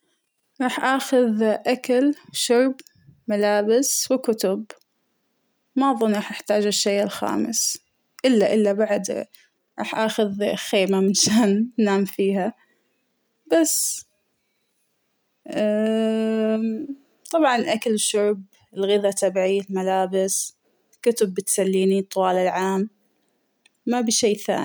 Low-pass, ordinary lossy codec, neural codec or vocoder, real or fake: none; none; none; real